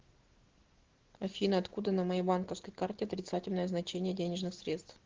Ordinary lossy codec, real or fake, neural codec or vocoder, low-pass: Opus, 16 kbps; real; none; 7.2 kHz